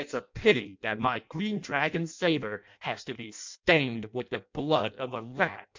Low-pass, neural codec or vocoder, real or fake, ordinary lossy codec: 7.2 kHz; codec, 16 kHz in and 24 kHz out, 0.6 kbps, FireRedTTS-2 codec; fake; MP3, 64 kbps